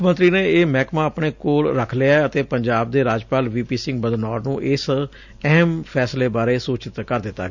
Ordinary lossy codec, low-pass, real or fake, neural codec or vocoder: none; 7.2 kHz; real; none